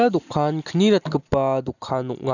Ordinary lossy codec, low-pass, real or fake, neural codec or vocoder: none; 7.2 kHz; real; none